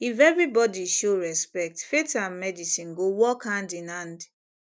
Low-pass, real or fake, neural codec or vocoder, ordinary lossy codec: none; real; none; none